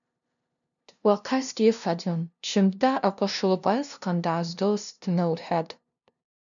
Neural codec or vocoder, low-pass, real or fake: codec, 16 kHz, 0.5 kbps, FunCodec, trained on LibriTTS, 25 frames a second; 7.2 kHz; fake